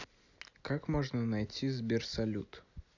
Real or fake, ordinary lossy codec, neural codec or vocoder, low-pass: real; none; none; 7.2 kHz